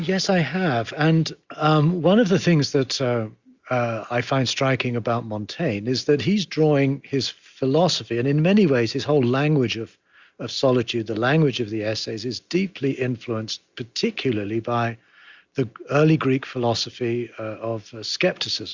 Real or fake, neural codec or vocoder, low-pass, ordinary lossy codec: real; none; 7.2 kHz; Opus, 64 kbps